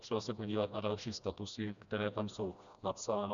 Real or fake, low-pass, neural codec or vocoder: fake; 7.2 kHz; codec, 16 kHz, 1 kbps, FreqCodec, smaller model